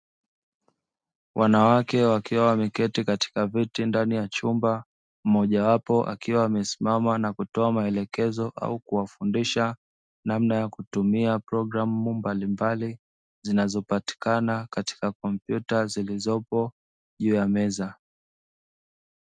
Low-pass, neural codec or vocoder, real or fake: 9.9 kHz; none; real